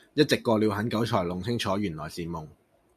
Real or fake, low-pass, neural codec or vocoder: real; 14.4 kHz; none